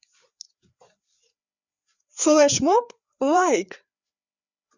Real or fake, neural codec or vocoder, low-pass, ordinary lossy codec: fake; codec, 16 kHz, 4 kbps, FreqCodec, larger model; 7.2 kHz; Opus, 64 kbps